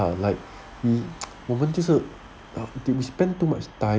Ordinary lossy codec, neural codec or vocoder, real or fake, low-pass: none; none; real; none